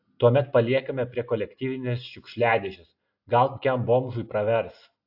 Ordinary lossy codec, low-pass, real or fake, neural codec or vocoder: AAC, 48 kbps; 5.4 kHz; real; none